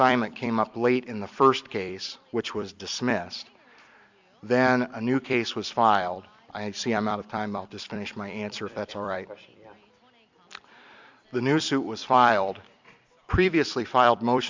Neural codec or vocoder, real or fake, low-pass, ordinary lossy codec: vocoder, 44.1 kHz, 128 mel bands every 256 samples, BigVGAN v2; fake; 7.2 kHz; MP3, 64 kbps